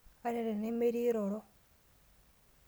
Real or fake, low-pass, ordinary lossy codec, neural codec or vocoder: real; none; none; none